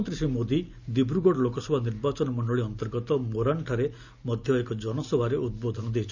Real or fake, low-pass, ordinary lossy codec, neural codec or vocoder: real; 7.2 kHz; none; none